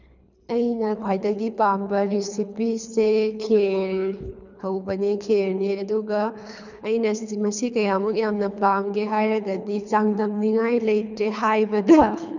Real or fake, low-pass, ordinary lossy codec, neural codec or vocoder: fake; 7.2 kHz; none; codec, 24 kHz, 3 kbps, HILCodec